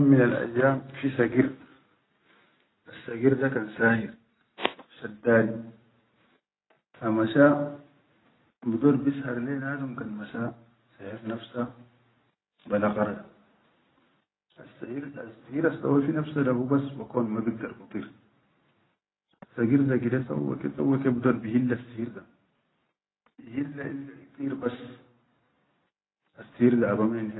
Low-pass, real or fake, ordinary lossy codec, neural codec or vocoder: 7.2 kHz; real; AAC, 16 kbps; none